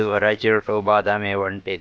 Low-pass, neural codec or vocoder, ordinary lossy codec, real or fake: none; codec, 16 kHz, about 1 kbps, DyCAST, with the encoder's durations; none; fake